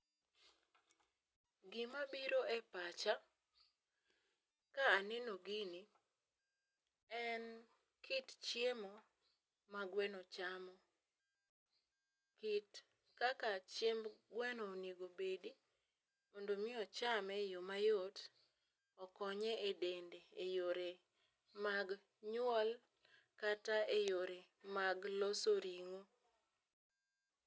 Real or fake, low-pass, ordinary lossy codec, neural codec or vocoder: real; none; none; none